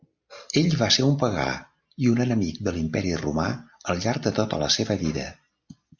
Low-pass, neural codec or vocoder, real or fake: 7.2 kHz; none; real